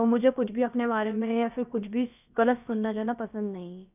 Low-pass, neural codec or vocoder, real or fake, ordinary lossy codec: 3.6 kHz; codec, 16 kHz, about 1 kbps, DyCAST, with the encoder's durations; fake; none